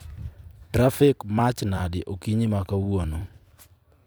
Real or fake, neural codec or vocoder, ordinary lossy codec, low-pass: fake; vocoder, 44.1 kHz, 128 mel bands every 256 samples, BigVGAN v2; none; none